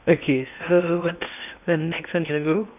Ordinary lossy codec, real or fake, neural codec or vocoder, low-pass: none; fake; codec, 16 kHz in and 24 kHz out, 0.8 kbps, FocalCodec, streaming, 65536 codes; 3.6 kHz